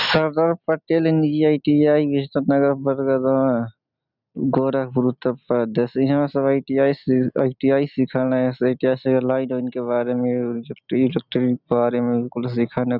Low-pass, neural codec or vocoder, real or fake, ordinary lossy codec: 5.4 kHz; none; real; none